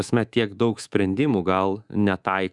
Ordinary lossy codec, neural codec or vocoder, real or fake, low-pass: Opus, 64 kbps; codec, 24 kHz, 3.1 kbps, DualCodec; fake; 10.8 kHz